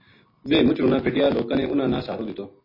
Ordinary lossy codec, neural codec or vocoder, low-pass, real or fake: MP3, 24 kbps; none; 5.4 kHz; real